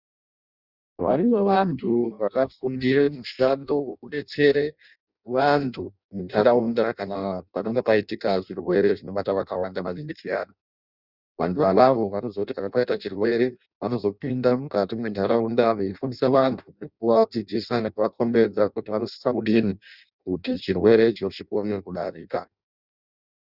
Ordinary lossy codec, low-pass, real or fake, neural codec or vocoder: Opus, 64 kbps; 5.4 kHz; fake; codec, 16 kHz in and 24 kHz out, 0.6 kbps, FireRedTTS-2 codec